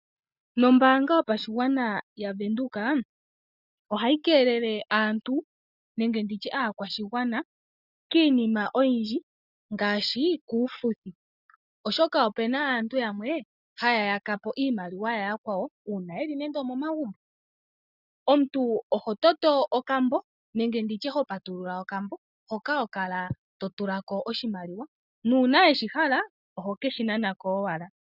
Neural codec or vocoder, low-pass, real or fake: none; 5.4 kHz; real